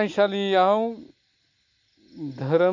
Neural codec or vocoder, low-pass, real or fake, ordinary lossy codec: none; 7.2 kHz; real; MP3, 48 kbps